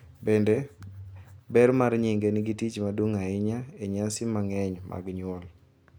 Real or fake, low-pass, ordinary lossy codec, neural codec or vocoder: real; none; none; none